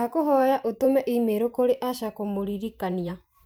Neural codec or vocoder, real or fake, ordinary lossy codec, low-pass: vocoder, 44.1 kHz, 128 mel bands every 512 samples, BigVGAN v2; fake; none; none